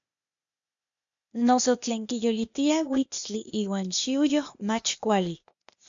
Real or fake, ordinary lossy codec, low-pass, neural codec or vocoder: fake; AAC, 64 kbps; 7.2 kHz; codec, 16 kHz, 0.8 kbps, ZipCodec